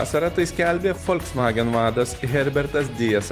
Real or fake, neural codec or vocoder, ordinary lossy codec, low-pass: fake; vocoder, 44.1 kHz, 128 mel bands every 256 samples, BigVGAN v2; Opus, 32 kbps; 14.4 kHz